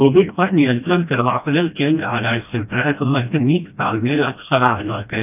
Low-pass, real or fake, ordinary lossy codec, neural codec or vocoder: 3.6 kHz; fake; none; codec, 16 kHz, 1 kbps, FreqCodec, smaller model